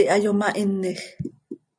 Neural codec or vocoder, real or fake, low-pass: none; real; 9.9 kHz